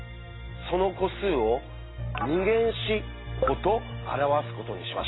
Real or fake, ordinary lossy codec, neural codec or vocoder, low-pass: real; AAC, 16 kbps; none; 7.2 kHz